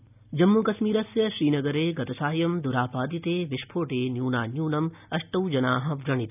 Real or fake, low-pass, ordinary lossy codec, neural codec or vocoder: real; 3.6 kHz; none; none